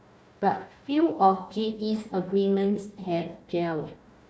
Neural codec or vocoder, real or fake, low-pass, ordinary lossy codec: codec, 16 kHz, 1 kbps, FunCodec, trained on Chinese and English, 50 frames a second; fake; none; none